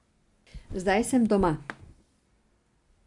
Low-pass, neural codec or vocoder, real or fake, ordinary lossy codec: 10.8 kHz; none; real; MP3, 64 kbps